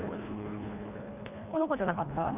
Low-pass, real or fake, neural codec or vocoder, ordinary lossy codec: 3.6 kHz; fake; codec, 24 kHz, 1.5 kbps, HILCodec; none